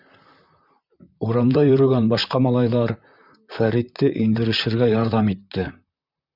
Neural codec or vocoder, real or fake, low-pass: vocoder, 44.1 kHz, 128 mel bands, Pupu-Vocoder; fake; 5.4 kHz